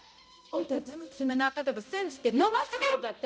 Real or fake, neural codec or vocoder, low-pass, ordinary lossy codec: fake; codec, 16 kHz, 0.5 kbps, X-Codec, HuBERT features, trained on balanced general audio; none; none